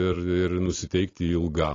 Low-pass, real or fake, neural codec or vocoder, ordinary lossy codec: 7.2 kHz; real; none; AAC, 32 kbps